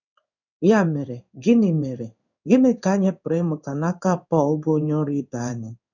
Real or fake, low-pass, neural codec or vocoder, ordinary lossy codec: fake; 7.2 kHz; codec, 16 kHz in and 24 kHz out, 1 kbps, XY-Tokenizer; none